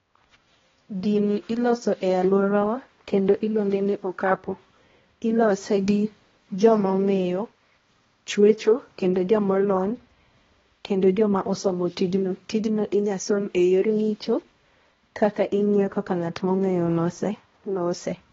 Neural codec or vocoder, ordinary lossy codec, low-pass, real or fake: codec, 16 kHz, 1 kbps, X-Codec, HuBERT features, trained on balanced general audio; AAC, 24 kbps; 7.2 kHz; fake